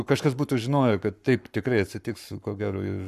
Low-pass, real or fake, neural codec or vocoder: 14.4 kHz; fake; codec, 44.1 kHz, 7.8 kbps, Pupu-Codec